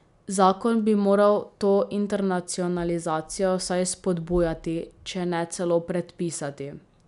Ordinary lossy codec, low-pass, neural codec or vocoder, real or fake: none; 10.8 kHz; none; real